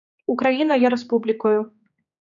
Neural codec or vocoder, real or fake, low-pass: codec, 16 kHz, 4 kbps, X-Codec, HuBERT features, trained on general audio; fake; 7.2 kHz